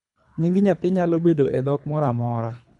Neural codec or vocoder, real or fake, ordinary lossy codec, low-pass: codec, 24 kHz, 3 kbps, HILCodec; fake; none; 10.8 kHz